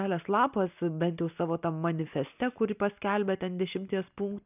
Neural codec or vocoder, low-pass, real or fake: none; 3.6 kHz; real